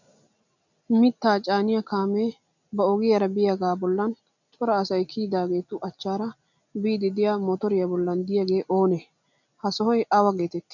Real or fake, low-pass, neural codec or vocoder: real; 7.2 kHz; none